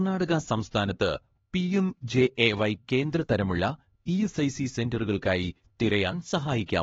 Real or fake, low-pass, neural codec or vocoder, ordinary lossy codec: fake; 7.2 kHz; codec, 16 kHz, 2 kbps, X-Codec, HuBERT features, trained on LibriSpeech; AAC, 24 kbps